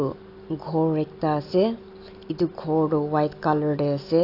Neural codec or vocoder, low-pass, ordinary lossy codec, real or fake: none; 5.4 kHz; AAC, 32 kbps; real